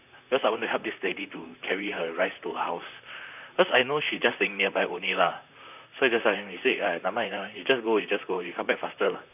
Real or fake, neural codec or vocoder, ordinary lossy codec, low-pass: fake; vocoder, 44.1 kHz, 128 mel bands, Pupu-Vocoder; none; 3.6 kHz